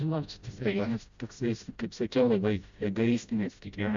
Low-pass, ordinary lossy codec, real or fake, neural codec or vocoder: 7.2 kHz; Opus, 64 kbps; fake; codec, 16 kHz, 0.5 kbps, FreqCodec, smaller model